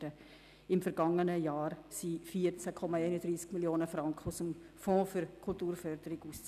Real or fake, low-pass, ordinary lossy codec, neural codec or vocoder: real; 14.4 kHz; none; none